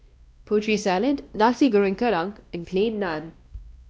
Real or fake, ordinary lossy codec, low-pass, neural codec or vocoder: fake; none; none; codec, 16 kHz, 1 kbps, X-Codec, WavLM features, trained on Multilingual LibriSpeech